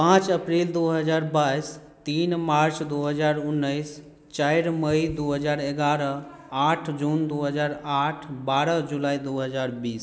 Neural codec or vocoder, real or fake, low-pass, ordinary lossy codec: none; real; none; none